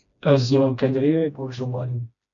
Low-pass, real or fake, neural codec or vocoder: 7.2 kHz; fake; codec, 16 kHz, 1 kbps, FreqCodec, smaller model